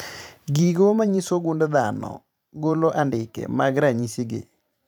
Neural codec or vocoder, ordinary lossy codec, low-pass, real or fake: vocoder, 44.1 kHz, 128 mel bands every 512 samples, BigVGAN v2; none; none; fake